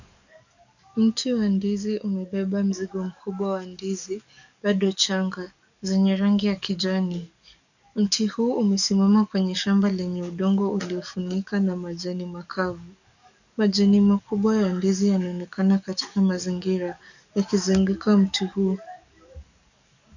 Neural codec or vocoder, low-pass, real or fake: codec, 44.1 kHz, 7.8 kbps, DAC; 7.2 kHz; fake